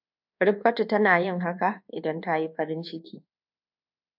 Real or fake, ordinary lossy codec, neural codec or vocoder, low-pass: fake; AAC, 48 kbps; codec, 24 kHz, 1.2 kbps, DualCodec; 5.4 kHz